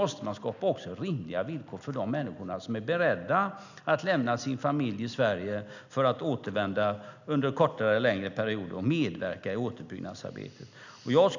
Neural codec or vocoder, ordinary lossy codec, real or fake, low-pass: none; none; real; 7.2 kHz